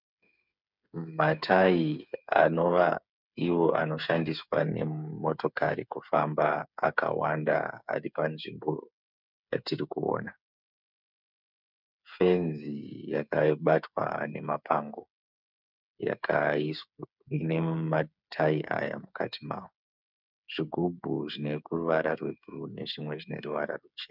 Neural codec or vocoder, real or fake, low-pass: codec, 16 kHz, 8 kbps, FreqCodec, smaller model; fake; 5.4 kHz